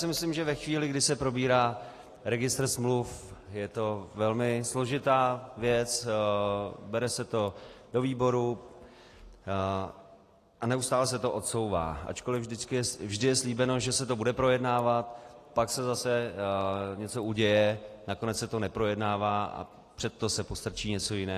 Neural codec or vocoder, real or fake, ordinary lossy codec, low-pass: none; real; AAC, 48 kbps; 14.4 kHz